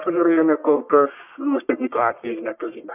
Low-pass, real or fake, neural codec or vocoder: 3.6 kHz; fake; codec, 44.1 kHz, 1.7 kbps, Pupu-Codec